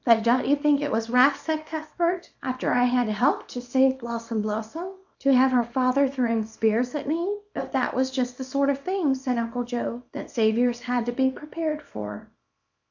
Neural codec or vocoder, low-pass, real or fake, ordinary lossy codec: codec, 24 kHz, 0.9 kbps, WavTokenizer, small release; 7.2 kHz; fake; AAC, 48 kbps